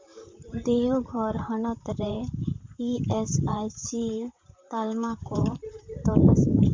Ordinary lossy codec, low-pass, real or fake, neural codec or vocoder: MP3, 64 kbps; 7.2 kHz; fake; vocoder, 44.1 kHz, 128 mel bands, Pupu-Vocoder